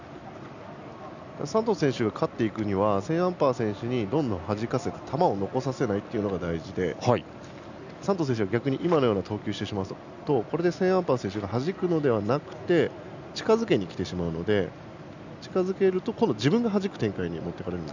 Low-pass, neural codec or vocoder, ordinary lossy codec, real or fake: 7.2 kHz; none; none; real